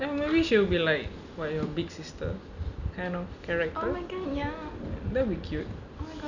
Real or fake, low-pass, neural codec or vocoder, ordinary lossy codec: real; 7.2 kHz; none; none